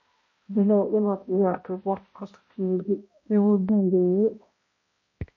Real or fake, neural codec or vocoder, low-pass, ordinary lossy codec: fake; codec, 16 kHz, 0.5 kbps, X-Codec, HuBERT features, trained on balanced general audio; 7.2 kHz; MP3, 32 kbps